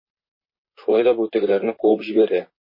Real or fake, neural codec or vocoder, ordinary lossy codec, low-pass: fake; vocoder, 44.1 kHz, 128 mel bands, Pupu-Vocoder; MP3, 24 kbps; 5.4 kHz